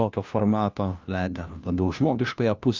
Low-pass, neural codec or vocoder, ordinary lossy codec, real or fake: 7.2 kHz; codec, 16 kHz, 1 kbps, FunCodec, trained on LibriTTS, 50 frames a second; Opus, 24 kbps; fake